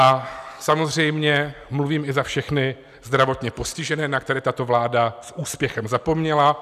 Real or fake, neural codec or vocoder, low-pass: real; none; 14.4 kHz